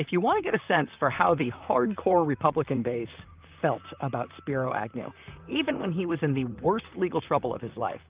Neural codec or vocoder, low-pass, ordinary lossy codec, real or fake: vocoder, 44.1 kHz, 128 mel bands, Pupu-Vocoder; 3.6 kHz; Opus, 24 kbps; fake